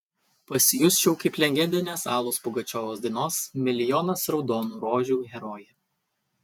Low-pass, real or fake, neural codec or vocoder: 19.8 kHz; fake; vocoder, 48 kHz, 128 mel bands, Vocos